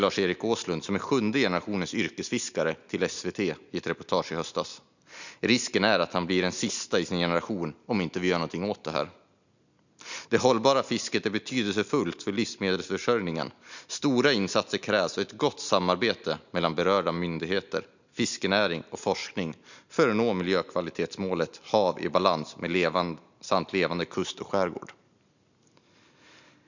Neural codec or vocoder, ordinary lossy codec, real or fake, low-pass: none; none; real; 7.2 kHz